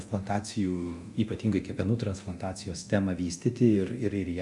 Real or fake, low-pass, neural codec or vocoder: fake; 10.8 kHz; codec, 24 kHz, 0.9 kbps, DualCodec